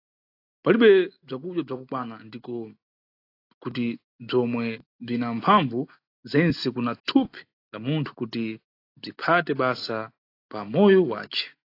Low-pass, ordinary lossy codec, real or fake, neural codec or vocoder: 5.4 kHz; AAC, 32 kbps; real; none